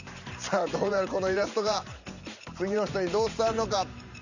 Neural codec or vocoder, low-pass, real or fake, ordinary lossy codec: vocoder, 44.1 kHz, 128 mel bands every 512 samples, BigVGAN v2; 7.2 kHz; fake; none